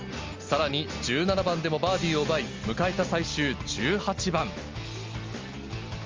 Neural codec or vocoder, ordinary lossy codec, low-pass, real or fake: none; Opus, 32 kbps; 7.2 kHz; real